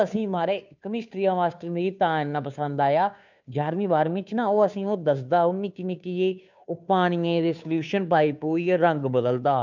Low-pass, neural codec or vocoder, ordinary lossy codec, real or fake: 7.2 kHz; codec, 16 kHz, 2 kbps, FunCodec, trained on Chinese and English, 25 frames a second; none; fake